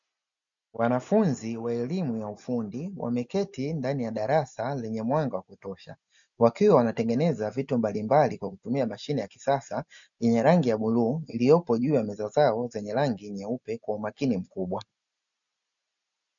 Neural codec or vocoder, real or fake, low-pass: none; real; 7.2 kHz